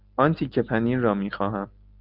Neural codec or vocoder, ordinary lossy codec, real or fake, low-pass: none; Opus, 24 kbps; real; 5.4 kHz